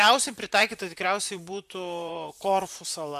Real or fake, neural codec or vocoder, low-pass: fake; vocoder, 44.1 kHz, 128 mel bands, Pupu-Vocoder; 14.4 kHz